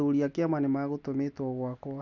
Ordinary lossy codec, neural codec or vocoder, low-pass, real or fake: none; none; 7.2 kHz; real